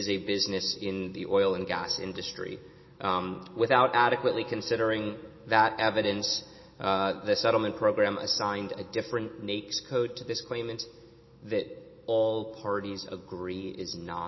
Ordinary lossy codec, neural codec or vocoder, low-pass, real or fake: MP3, 24 kbps; none; 7.2 kHz; real